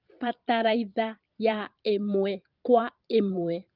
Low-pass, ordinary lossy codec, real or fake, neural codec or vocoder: 5.4 kHz; Opus, 32 kbps; fake; vocoder, 44.1 kHz, 128 mel bands every 512 samples, BigVGAN v2